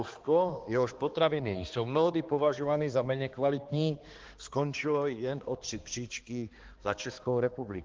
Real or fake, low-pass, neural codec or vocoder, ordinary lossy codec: fake; 7.2 kHz; codec, 16 kHz, 2 kbps, X-Codec, HuBERT features, trained on balanced general audio; Opus, 16 kbps